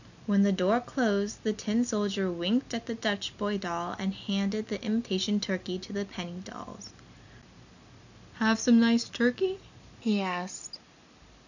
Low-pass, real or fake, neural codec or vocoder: 7.2 kHz; real; none